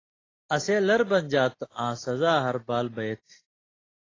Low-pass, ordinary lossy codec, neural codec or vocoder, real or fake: 7.2 kHz; AAC, 32 kbps; none; real